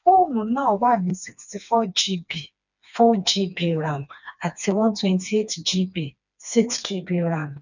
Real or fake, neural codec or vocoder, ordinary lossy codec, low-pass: fake; codec, 16 kHz, 2 kbps, FreqCodec, smaller model; none; 7.2 kHz